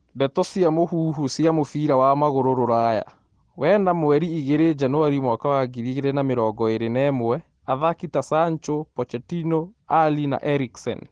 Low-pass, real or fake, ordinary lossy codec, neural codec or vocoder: 9.9 kHz; real; Opus, 16 kbps; none